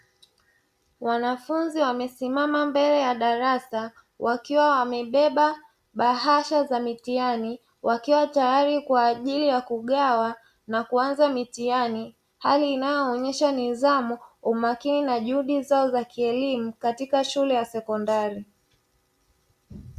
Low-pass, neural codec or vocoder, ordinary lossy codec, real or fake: 14.4 kHz; none; Opus, 64 kbps; real